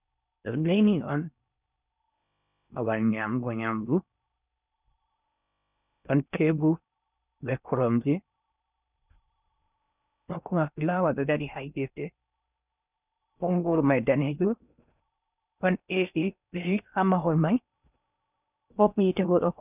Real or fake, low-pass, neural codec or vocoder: fake; 3.6 kHz; codec, 16 kHz in and 24 kHz out, 0.6 kbps, FocalCodec, streaming, 4096 codes